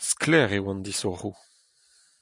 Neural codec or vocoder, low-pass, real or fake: none; 10.8 kHz; real